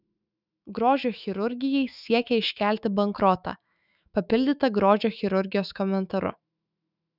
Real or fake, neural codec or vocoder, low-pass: fake; autoencoder, 48 kHz, 128 numbers a frame, DAC-VAE, trained on Japanese speech; 5.4 kHz